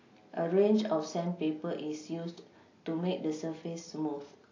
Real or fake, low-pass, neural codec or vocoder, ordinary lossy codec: real; 7.2 kHz; none; AAC, 32 kbps